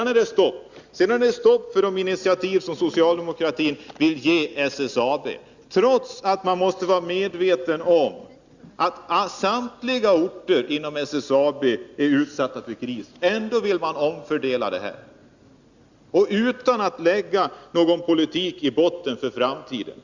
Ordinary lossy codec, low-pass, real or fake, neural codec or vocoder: Opus, 64 kbps; 7.2 kHz; real; none